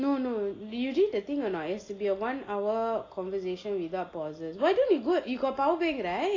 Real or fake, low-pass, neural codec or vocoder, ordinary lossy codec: real; 7.2 kHz; none; AAC, 32 kbps